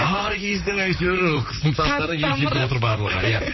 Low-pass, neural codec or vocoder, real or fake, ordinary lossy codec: 7.2 kHz; vocoder, 44.1 kHz, 128 mel bands, Pupu-Vocoder; fake; MP3, 24 kbps